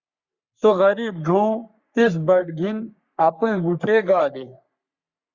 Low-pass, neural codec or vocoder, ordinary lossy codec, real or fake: 7.2 kHz; codec, 44.1 kHz, 3.4 kbps, Pupu-Codec; Opus, 64 kbps; fake